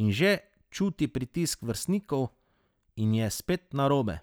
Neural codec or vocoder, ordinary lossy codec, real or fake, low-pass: none; none; real; none